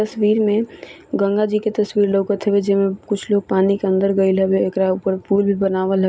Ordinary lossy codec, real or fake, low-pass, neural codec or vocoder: none; real; none; none